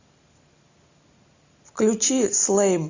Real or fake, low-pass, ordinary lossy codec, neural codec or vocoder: real; 7.2 kHz; AAC, 48 kbps; none